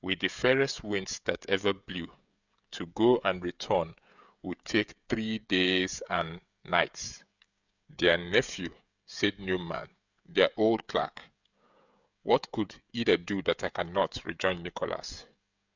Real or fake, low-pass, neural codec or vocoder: fake; 7.2 kHz; codec, 16 kHz, 16 kbps, FreqCodec, smaller model